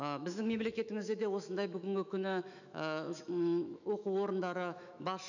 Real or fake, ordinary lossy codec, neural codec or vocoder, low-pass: fake; none; autoencoder, 48 kHz, 128 numbers a frame, DAC-VAE, trained on Japanese speech; 7.2 kHz